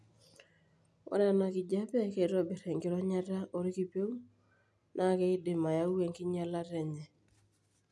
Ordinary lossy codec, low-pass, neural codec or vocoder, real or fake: none; none; none; real